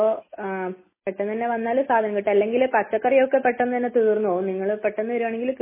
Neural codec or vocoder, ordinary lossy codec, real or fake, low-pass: none; MP3, 16 kbps; real; 3.6 kHz